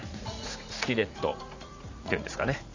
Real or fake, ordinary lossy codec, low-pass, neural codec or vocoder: fake; AAC, 48 kbps; 7.2 kHz; vocoder, 44.1 kHz, 80 mel bands, Vocos